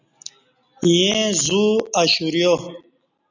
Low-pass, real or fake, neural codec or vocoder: 7.2 kHz; real; none